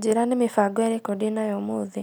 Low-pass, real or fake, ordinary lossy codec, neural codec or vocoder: none; real; none; none